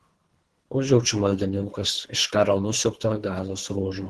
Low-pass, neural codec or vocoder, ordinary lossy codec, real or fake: 10.8 kHz; codec, 24 kHz, 3 kbps, HILCodec; Opus, 16 kbps; fake